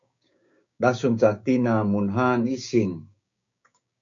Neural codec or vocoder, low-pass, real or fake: codec, 16 kHz, 6 kbps, DAC; 7.2 kHz; fake